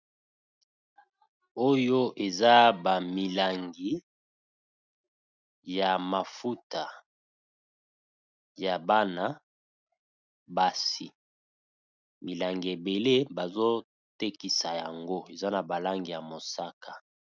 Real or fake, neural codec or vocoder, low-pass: real; none; 7.2 kHz